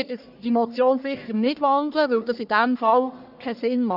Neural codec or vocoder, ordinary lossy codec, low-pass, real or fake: codec, 44.1 kHz, 1.7 kbps, Pupu-Codec; none; 5.4 kHz; fake